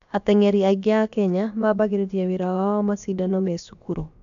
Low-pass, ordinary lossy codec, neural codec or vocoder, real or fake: 7.2 kHz; AAC, 64 kbps; codec, 16 kHz, about 1 kbps, DyCAST, with the encoder's durations; fake